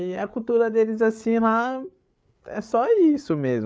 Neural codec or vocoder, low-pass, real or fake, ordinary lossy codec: codec, 16 kHz, 8 kbps, FreqCodec, larger model; none; fake; none